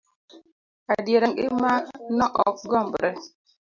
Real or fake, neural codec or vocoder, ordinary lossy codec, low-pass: real; none; MP3, 64 kbps; 7.2 kHz